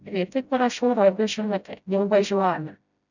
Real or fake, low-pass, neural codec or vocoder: fake; 7.2 kHz; codec, 16 kHz, 0.5 kbps, FreqCodec, smaller model